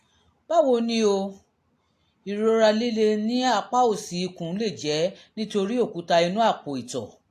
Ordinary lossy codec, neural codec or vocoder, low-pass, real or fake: AAC, 64 kbps; none; 14.4 kHz; real